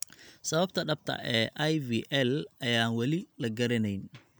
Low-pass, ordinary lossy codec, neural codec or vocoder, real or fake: none; none; none; real